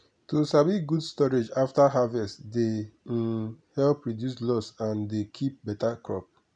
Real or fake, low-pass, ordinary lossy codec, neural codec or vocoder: real; 9.9 kHz; none; none